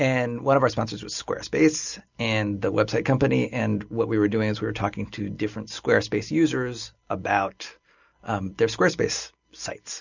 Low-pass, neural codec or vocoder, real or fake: 7.2 kHz; none; real